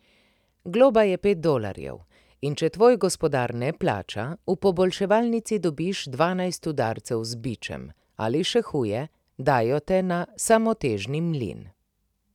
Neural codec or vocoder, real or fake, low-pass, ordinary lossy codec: none; real; 19.8 kHz; none